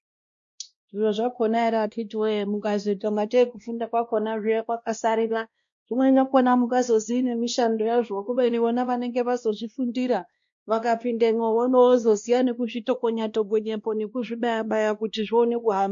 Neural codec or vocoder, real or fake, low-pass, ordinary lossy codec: codec, 16 kHz, 1 kbps, X-Codec, WavLM features, trained on Multilingual LibriSpeech; fake; 7.2 kHz; MP3, 48 kbps